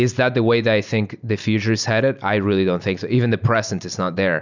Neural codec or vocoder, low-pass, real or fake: none; 7.2 kHz; real